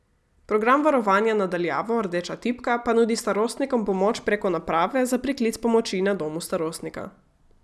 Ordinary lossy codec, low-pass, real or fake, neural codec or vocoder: none; none; real; none